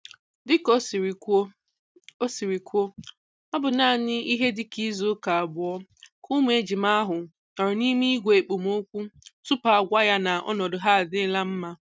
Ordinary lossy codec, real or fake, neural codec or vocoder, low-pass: none; real; none; none